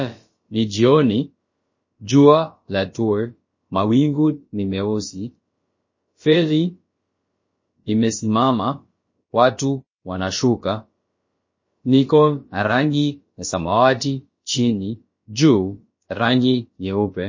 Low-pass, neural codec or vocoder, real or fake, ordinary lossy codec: 7.2 kHz; codec, 16 kHz, about 1 kbps, DyCAST, with the encoder's durations; fake; MP3, 32 kbps